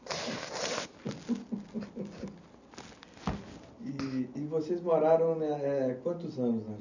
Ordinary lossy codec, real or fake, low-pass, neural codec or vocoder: none; real; 7.2 kHz; none